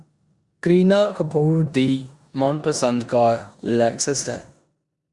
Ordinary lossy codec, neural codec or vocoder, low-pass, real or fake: Opus, 64 kbps; codec, 16 kHz in and 24 kHz out, 0.9 kbps, LongCat-Audio-Codec, four codebook decoder; 10.8 kHz; fake